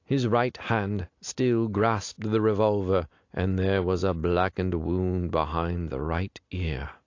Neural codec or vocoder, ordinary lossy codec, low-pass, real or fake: none; AAC, 48 kbps; 7.2 kHz; real